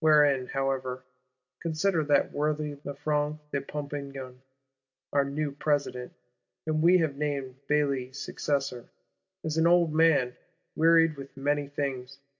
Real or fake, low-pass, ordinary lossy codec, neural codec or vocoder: real; 7.2 kHz; AAC, 48 kbps; none